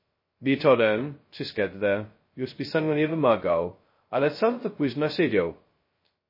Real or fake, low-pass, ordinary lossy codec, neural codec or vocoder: fake; 5.4 kHz; MP3, 24 kbps; codec, 16 kHz, 0.2 kbps, FocalCodec